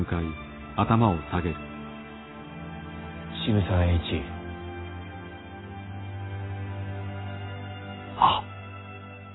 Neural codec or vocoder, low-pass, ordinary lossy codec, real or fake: none; 7.2 kHz; AAC, 16 kbps; real